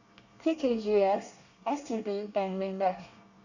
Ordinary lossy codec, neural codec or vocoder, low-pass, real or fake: none; codec, 24 kHz, 1 kbps, SNAC; 7.2 kHz; fake